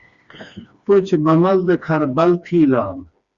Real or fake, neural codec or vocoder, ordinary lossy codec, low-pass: fake; codec, 16 kHz, 2 kbps, FreqCodec, smaller model; Opus, 64 kbps; 7.2 kHz